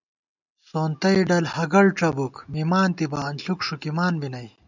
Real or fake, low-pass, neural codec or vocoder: real; 7.2 kHz; none